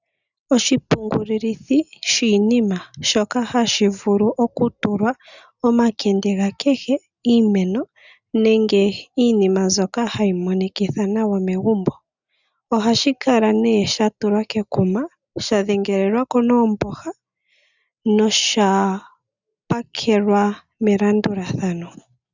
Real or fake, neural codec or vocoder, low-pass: real; none; 7.2 kHz